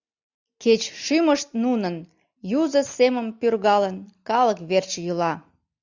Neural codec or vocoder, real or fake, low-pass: none; real; 7.2 kHz